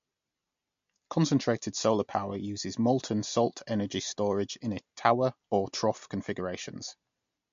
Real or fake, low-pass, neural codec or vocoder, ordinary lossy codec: real; 7.2 kHz; none; MP3, 48 kbps